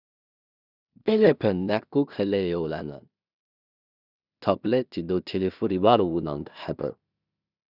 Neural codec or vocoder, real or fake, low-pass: codec, 16 kHz in and 24 kHz out, 0.4 kbps, LongCat-Audio-Codec, two codebook decoder; fake; 5.4 kHz